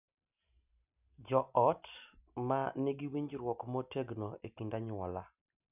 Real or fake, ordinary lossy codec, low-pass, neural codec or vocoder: real; none; 3.6 kHz; none